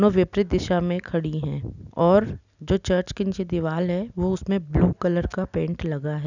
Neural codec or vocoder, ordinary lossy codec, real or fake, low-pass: none; none; real; 7.2 kHz